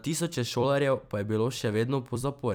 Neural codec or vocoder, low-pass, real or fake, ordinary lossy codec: vocoder, 44.1 kHz, 128 mel bands every 256 samples, BigVGAN v2; none; fake; none